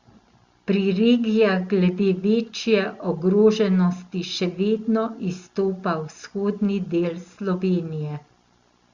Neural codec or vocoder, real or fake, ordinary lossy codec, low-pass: none; real; Opus, 64 kbps; 7.2 kHz